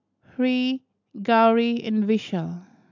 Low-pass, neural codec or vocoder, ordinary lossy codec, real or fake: 7.2 kHz; none; MP3, 64 kbps; real